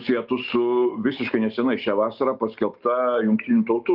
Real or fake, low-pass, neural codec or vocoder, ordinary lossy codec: real; 5.4 kHz; none; Opus, 32 kbps